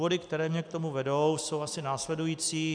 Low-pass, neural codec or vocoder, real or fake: 9.9 kHz; none; real